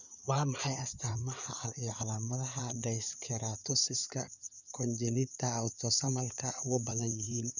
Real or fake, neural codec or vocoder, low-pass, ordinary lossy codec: fake; codec, 16 kHz in and 24 kHz out, 2.2 kbps, FireRedTTS-2 codec; 7.2 kHz; none